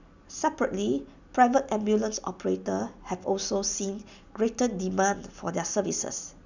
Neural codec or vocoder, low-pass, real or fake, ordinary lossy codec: none; 7.2 kHz; real; none